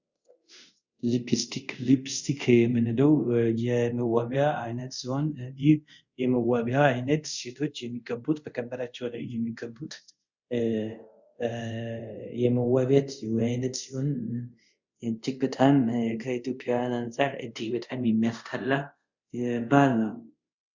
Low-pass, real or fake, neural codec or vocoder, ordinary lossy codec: 7.2 kHz; fake; codec, 24 kHz, 0.5 kbps, DualCodec; Opus, 64 kbps